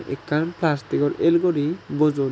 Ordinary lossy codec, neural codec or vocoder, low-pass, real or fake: none; none; none; real